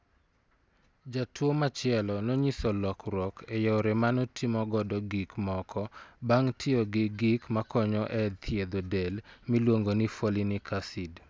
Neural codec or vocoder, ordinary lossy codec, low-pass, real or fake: none; none; none; real